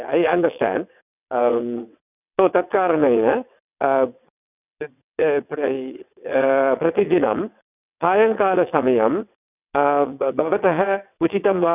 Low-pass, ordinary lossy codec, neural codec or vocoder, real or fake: 3.6 kHz; none; vocoder, 22.05 kHz, 80 mel bands, WaveNeXt; fake